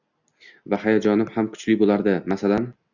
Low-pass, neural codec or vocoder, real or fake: 7.2 kHz; none; real